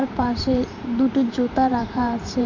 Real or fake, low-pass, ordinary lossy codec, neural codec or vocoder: fake; 7.2 kHz; none; vocoder, 44.1 kHz, 128 mel bands every 512 samples, BigVGAN v2